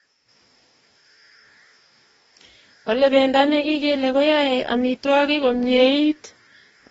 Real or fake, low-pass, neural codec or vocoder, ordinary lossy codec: fake; 19.8 kHz; codec, 44.1 kHz, 2.6 kbps, DAC; AAC, 24 kbps